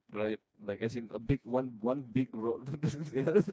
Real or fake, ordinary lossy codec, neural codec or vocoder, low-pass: fake; none; codec, 16 kHz, 2 kbps, FreqCodec, smaller model; none